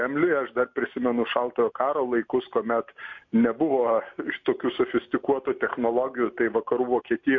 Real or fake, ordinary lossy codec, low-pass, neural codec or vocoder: real; MP3, 48 kbps; 7.2 kHz; none